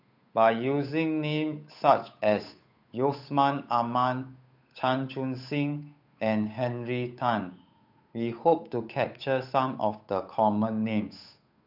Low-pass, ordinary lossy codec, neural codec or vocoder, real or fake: 5.4 kHz; none; codec, 16 kHz, 8 kbps, FunCodec, trained on Chinese and English, 25 frames a second; fake